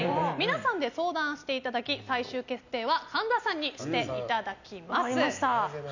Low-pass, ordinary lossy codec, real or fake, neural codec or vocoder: 7.2 kHz; none; real; none